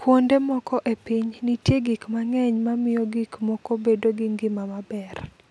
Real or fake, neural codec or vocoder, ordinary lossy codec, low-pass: real; none; none; none